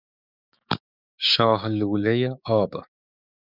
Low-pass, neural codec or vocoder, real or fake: 5.4 kHz; codec, 16 kHz, 6 kbps, DAC; fake